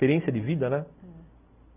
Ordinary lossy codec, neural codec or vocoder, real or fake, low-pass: none; none; real; 3.6 kHz